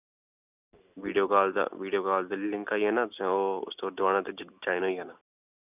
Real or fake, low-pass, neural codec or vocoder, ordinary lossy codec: real; 3.6 kHz; none; none